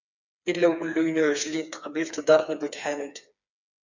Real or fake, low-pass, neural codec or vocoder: fake; 7.2 kHz; codec, 16 kHz, 4 kbps, FreqCodec, smaller model